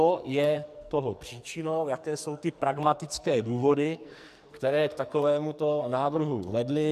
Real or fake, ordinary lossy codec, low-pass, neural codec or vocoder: fake; MP3, 96 kbps; 14.4 kHz; codec, 44.1 kHz, 2.6 kbps, SNAC